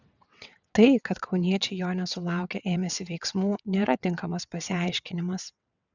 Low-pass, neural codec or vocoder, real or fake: 7.2 kHz; vocoder, 22.05 kHz, 80 mel bands, WaveNeXt; fake